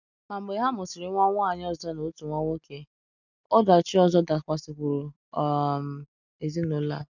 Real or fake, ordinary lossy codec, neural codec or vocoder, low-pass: real; none; none; 7.2 kHz